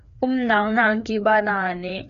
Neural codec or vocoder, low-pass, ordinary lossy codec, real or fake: codec, 16 kHz, 2 kbps, FreqCodec, larger model; 7.2 kHz; MP3, 96 kbps; fake